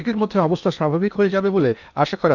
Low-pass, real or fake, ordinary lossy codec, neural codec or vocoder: 7.2 kHz; fake; none; codec, 16 kHz in and 24 kHz out, 0.8 kbps, FocalCodec, streaming, 65536 codes